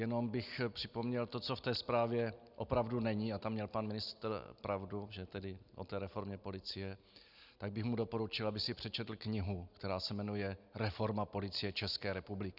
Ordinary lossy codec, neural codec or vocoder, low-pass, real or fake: Opus, 64 kbps; none; 5.4 kHz; real